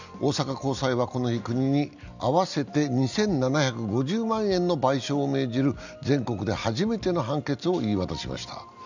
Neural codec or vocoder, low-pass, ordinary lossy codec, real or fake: none; 7.2 kHz; none; real